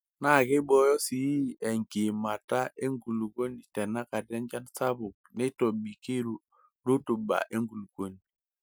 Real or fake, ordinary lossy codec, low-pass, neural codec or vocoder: real; none; none; none